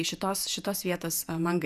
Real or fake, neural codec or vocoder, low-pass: fake; vocoder, 48 kHz, 128 mel bands, Vocos; 14.4 kHz